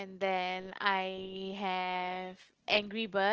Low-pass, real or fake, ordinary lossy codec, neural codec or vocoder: 7.2 kHz; real; Opus, 16 kbps; none